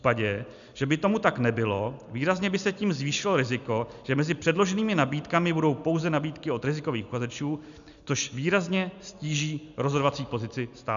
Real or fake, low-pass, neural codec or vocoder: real; 7.2 kHz; none